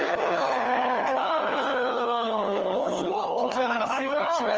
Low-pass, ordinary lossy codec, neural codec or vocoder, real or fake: 7.2 kHz; Opus, 24 kbps; codec, 16 kHz, 4 kbps, FunCodec, trained on LibriTTS, 50 frames a second; fake